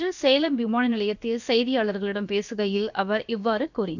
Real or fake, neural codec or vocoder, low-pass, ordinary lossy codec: fake; codec, 16 kHz, about 1 kbps, DyCAST, with the encoder's durations; 7.2 kHz; none